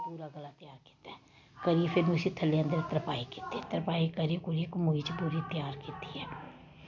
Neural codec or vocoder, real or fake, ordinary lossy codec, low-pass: none; real; none; 7.2 kHz